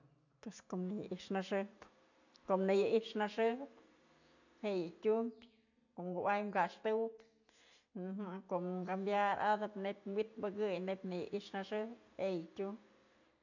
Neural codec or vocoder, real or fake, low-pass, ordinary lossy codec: none; real; 7.2 kHz; none